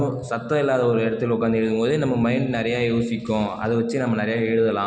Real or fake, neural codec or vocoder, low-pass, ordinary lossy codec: real; none; none; none